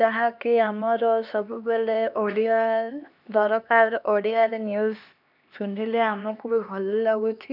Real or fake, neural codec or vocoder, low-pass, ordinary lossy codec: fake; codec, 16 kHz, 2 kbps, X-Codec, HuBERT features, trained on LibriSpeech; 5.4 kHz; none